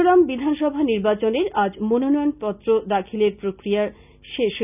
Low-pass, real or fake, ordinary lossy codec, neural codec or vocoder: 3.6 kHz; real; none; none